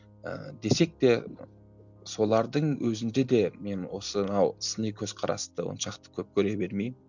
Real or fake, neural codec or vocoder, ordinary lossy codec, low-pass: real; none; none; 7.2 kHz